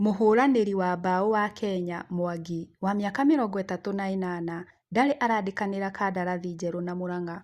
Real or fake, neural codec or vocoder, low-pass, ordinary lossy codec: real; none; 14.4 kHz; Opus, 64 kbps